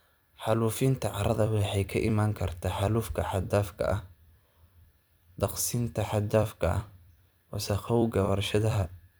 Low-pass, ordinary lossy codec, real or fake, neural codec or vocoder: none; none; fake; vocoder, 44.1 kHz, 128 mel bands every 256 samples, BigVGAN v2